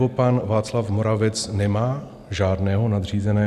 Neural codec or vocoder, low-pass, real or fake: none; 14.4 kHz; real